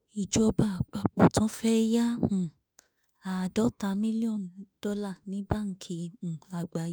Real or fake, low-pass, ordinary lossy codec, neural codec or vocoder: fake; none; none; autoencoder, 48 kHz, 32 numbers a frame, DAC-VAE, trained on Japanese speech